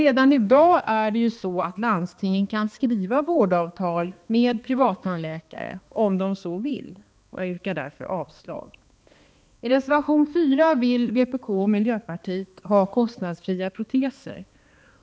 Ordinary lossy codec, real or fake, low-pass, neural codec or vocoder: none; fake; none; codec, 16 kHz, 2 kbps, X-Codec, HuBERT features, trained on balanced general audio